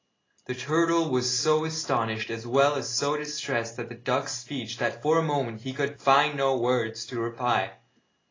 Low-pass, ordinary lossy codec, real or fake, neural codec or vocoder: 7.2 kHz; AAC, 32 kbps; real; none